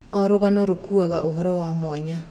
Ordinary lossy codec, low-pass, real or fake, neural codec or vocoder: none; 19.8 kHz; fake; codec, 44.1 kHz, 2.6 kbps, DAC